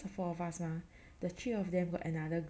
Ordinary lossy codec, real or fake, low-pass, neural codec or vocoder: none; real; none; none